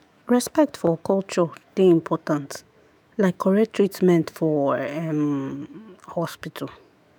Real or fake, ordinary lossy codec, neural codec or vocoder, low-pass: fake; none; autoencoder, 48 kHz, 128 numbers a frame, DAC-VAE, trained on Japanese speech; none